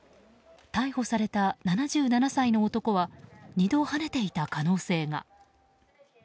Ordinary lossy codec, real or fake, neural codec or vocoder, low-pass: none; real; none; none